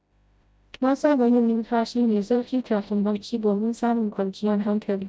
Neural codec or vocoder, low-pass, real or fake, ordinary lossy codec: codec, 16 kHz, 0.5 kbps, FreqCodec, smaller model; none; fake; none